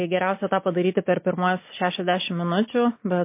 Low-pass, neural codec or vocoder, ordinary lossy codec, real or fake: 3.6 kHz; none; MP3, 24 kbps; real